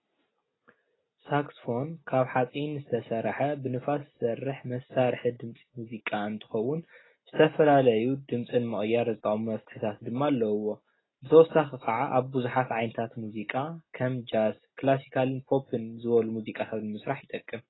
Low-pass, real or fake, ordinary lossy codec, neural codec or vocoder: 7.2 kHz; real; AAC, 16 kbps; none